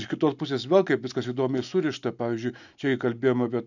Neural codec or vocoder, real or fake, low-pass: none; real; 7.2 kHz